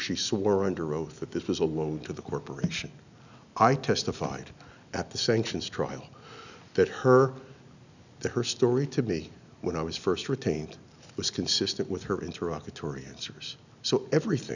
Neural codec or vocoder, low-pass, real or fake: none; 7.2 kHz; real